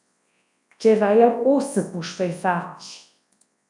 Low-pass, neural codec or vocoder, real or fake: 10.8 kHz; codec, 24 kHz, 0.9 kbps, WavTokenizer, large speech release; fake